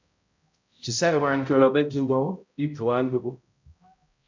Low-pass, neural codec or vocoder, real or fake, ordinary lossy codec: 7.2 kHz; codec, 16 kHz, 0.5 kbps, X-Codec, HuBERT features, trained on balanced general audio; fake; MP3, 64 kbps